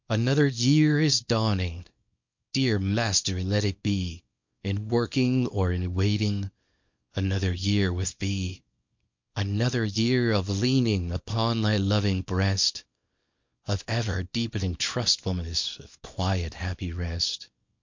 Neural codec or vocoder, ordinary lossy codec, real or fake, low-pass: codec, 24 kHz, 0.9 kbps, WavTokenizer, small release; MP3, 48 kbps; fake; 7.2 kHz